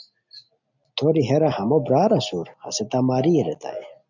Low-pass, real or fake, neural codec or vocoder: 7.2 kHz; real; none